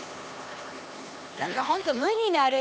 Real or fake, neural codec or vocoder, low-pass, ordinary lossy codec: fake; codec, 16 kHz, 2 kbps, X-Codec, HuBERT features, trained on LibriSpeech; none; none